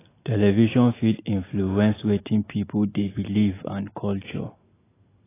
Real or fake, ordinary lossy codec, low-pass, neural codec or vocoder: real; AAC, 16 kbps; 3.6 kHz; none